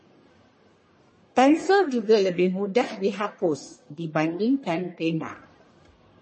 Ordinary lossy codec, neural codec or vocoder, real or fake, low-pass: MP3, 32 kbps; codec, 44.1 kHz, 1.7 kbps, Pupu-Codec; fake; 10.8 kHz